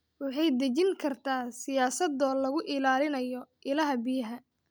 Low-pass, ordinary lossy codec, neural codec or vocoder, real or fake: none; none; none; real